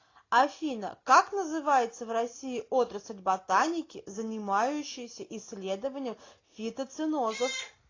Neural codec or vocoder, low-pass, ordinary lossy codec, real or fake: none; 7.2 kHz; AAC, 32 kbps; real